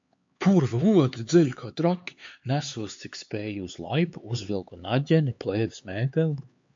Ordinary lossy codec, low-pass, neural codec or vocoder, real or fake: MP3, 48 kbps; 7.2 kHz; codec, 16 kHz, 4 kbps, X-Codec, HuBERT features, trained on LibriSpeech; fake